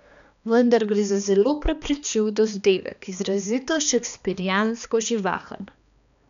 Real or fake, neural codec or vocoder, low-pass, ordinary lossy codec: fake; codec, 16 kHz, 2 kbps, X-Codec, HuBERT features, trained on balanced general audio; 7.2 kHz; none